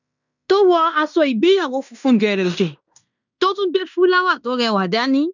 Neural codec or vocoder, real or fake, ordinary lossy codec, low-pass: codec, 16 kHz in and 24 kHz out, 0.9 kbps, LongCat-Audio-Codec, fine tuned four codebook decoder; fake; none; 7.2 kHz